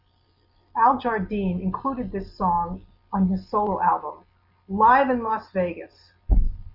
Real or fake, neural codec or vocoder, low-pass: real; none; 5.4 kHz